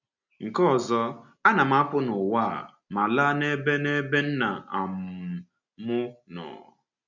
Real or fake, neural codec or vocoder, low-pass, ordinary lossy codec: real; none; 7.2 kHz; none